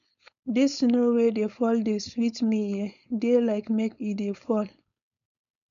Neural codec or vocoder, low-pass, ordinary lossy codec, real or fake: codec, 16 kHz, 4.8 kbps, FACodec; 7.2 kHz; none; fake